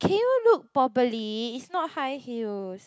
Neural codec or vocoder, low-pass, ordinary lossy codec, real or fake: none; none; none; real